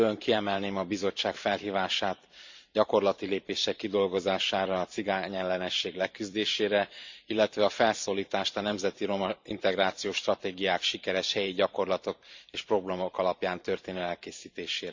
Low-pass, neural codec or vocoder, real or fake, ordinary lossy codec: 7.2 kHz; vocoder, 44.1 kHz, 128 mel bands every 512 samples, BigVGAN v2; fake; MP3, 64 kbps